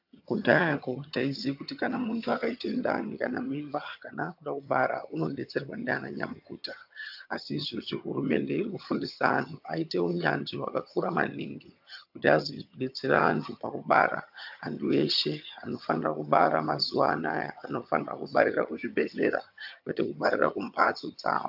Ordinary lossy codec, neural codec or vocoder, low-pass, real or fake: AAC, 48 kbps; vocoder, 22.05 kHz, 80 mel bands, HiFi-GAN; 5.4 kHz; fake